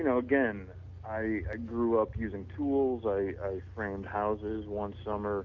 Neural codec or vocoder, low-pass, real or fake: none; 7.2 kHz; real